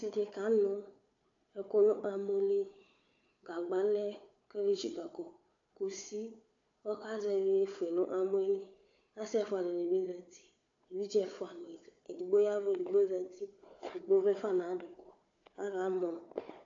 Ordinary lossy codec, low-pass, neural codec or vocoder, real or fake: AAC, 48 kbps; 7.2 kHz; codec, 16 kHz, 4 kbps, FunCodec, trained on Chinese and English, 50 frames a second; fake